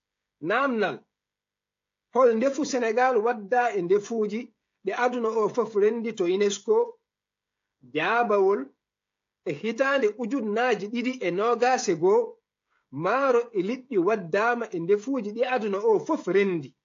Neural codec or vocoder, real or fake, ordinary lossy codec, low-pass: codec, 16 kHz, 16 kbps, FreqCodec, smaller model; fake; AAC, 48 kbps; 7.2 kHz